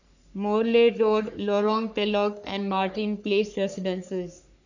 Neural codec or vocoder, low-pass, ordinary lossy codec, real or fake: codec, 44.1 kHz, 3.4 kbps, Pupu-Codec; 7.2 kHz; none; fake